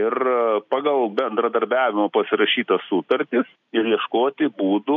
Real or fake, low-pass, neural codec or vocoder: real; 7.2 kHz; none